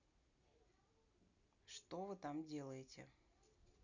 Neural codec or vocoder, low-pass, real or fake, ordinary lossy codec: none; 7.2 kHz; real; none